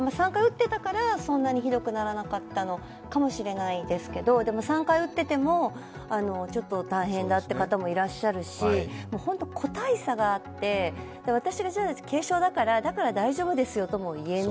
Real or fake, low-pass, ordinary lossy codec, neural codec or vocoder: real; none; none; none